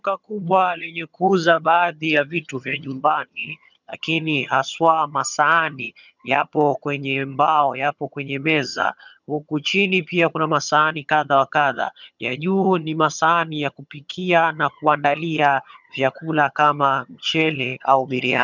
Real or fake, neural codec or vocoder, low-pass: fake; vocoder, 22.05 kHz, 80 mel bands, HiFi-GAN; 7.2 kHz